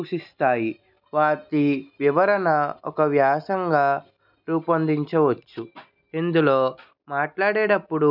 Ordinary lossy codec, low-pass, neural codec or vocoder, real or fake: none; 5.4 kHz; none; real